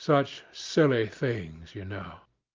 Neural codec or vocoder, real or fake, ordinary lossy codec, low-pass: none; real; Opus, 24 kbps; 7.2 kHz